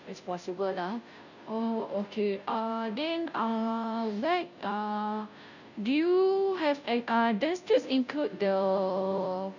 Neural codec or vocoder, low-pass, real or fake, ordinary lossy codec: codec, 16 kHz, 0.5 kbps, FunCodec, trained on Chinese and English, 25 frames a second; 7.2 kHz; fake; none